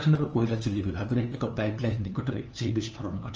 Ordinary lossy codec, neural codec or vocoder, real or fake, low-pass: Opus, 24 kbps; codec, 16 kHz, 2 kbps, FunCodec, trained on LibriTTS, 25 frames a second; fake; 7.2 kHz